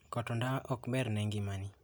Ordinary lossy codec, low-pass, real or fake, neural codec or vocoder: none; none; real; none